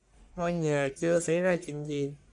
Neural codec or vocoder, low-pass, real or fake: codec, 44.1 kHz, 1.7 kbps, Pupu-Codec; 10.8 kHz; fake